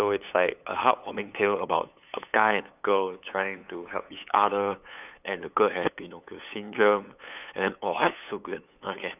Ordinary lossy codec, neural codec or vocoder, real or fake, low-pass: none; codec, 16 kHz, 8 kbps, FunCodec, trained on LibriTTS, 25 frames a second; fake; 3.6 kHz